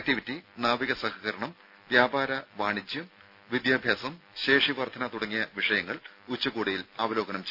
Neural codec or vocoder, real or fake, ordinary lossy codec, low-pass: none; real; none; 5.4 kHz